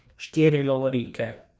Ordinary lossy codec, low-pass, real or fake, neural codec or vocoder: none; none; fake; codec, 16 kHz, 1 kbps, FreqCodec, larger model